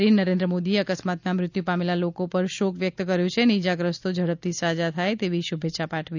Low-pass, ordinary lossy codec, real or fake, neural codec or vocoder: 7.2 kHz; none; real; none